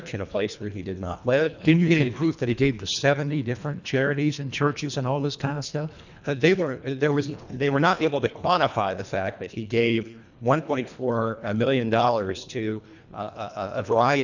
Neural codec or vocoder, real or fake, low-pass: codec, 24 kHz, 1.5 kbps, HILCodec; fake; 7.2 kHz